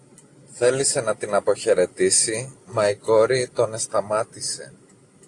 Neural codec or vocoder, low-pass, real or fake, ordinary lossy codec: none; 10.8 kHz; real; AAC, 48 kbps